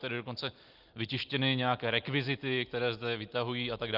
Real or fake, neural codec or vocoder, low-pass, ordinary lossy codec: real; none; 5.4 kHz; Opus, 32 kbps